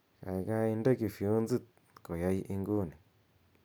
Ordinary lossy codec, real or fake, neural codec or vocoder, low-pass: none; real; none; none